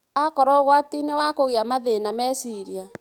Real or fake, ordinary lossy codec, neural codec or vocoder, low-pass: fake; none; codec, 44.1 kHz, 7.8 kbps, DAC; 19.8 kHz